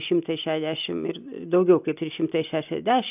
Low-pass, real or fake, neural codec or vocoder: 3.6 kHz; real; none